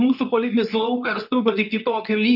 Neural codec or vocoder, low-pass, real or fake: codec, 24 kHz, 0.9 kbps, WavTokenizer, medium speech release version 2; 5.4 kHz; fake